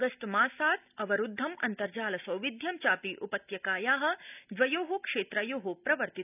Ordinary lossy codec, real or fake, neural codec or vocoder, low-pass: none; real; none; 3.6 kHz